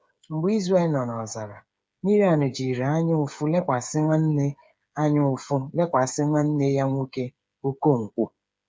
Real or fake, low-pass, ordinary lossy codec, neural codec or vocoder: fake; none; none; codec, 16 kHz, 8 kbps, FreqCodec, smaller model